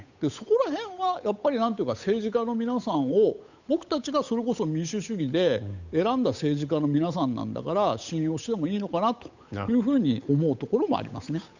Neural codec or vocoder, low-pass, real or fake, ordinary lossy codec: codec, 16 kHz, 8 kbps, FunCodec, trained on Chinese and English, 25 frames a second; 7.2 kHz; fake; none